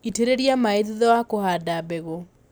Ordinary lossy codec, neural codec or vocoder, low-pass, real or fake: none; none; none; real